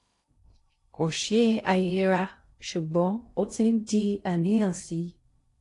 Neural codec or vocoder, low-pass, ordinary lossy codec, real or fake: codec, 16 kHz in and 24 kHz out, 0.6 kbps, FocalCodec, streaming, 2048 codes; 10.8 kHz; AAC, 48 kbps; fake